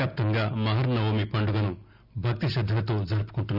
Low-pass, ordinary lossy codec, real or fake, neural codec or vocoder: 5.4 kHz; none; real; none